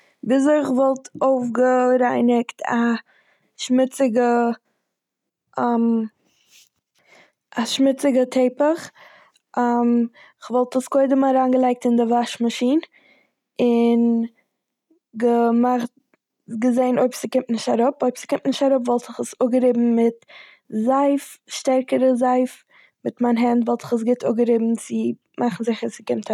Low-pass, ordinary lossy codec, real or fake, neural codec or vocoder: 19.8 kHz; none; real; none